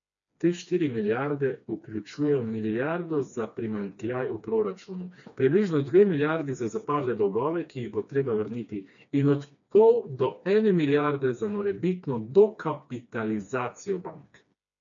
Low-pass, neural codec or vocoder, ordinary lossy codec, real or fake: 7.2 kHz; codec, 16 kHz, 2 kbps, FreqCodec, smaller model; MP3, 64 kbps; fake